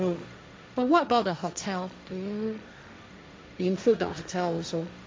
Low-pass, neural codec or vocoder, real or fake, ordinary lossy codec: none; codec, 16 kHz, 1.1 kbps, Voila-Tokenizer; fake; none